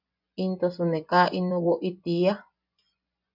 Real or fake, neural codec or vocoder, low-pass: fake; vocoder, 24 kHz, 100 mel bands, Vocos; 5.4 kHz